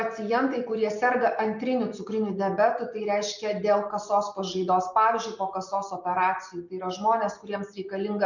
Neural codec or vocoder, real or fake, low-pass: none; real; 7.2 kHz